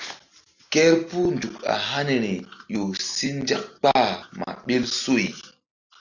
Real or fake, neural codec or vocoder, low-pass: real; none; 7.2 kHz